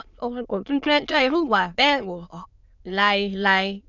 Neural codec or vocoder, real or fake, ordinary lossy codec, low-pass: autoencoder, 22.05 kHz, a latent of 192 numbers a frame, VITS, trained on many speakers; fake; none; 7.2 kHz